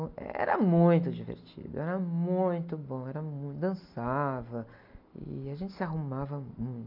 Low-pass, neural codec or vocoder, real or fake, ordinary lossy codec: 5.4 kHz; none; real; none